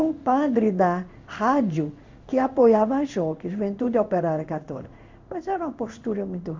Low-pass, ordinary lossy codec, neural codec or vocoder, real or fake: 7.2 kHz; MP3, 48 kbps; codec, 16 kHz in and 24 kHz out, 1 kbps, XY-Tokenizer; fake